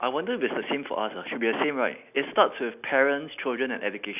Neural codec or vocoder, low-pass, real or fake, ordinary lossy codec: none; 3.6 kHz; real; none